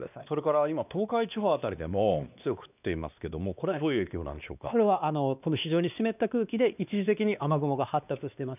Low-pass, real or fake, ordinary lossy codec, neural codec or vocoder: 3.6 kHz; fake; none; codec, 16 kHz, 2 kbps, X-Codec, WavLM features, trained on Multilingual LibriSpeech